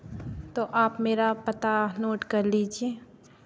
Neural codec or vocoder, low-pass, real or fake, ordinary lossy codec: none; none; real; none